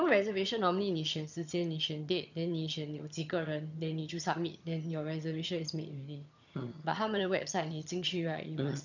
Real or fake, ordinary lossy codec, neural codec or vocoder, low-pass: fake; none; vocoder, 22.05 kHz, 80 mel bands, HiFi-GAN; 7.2 kHz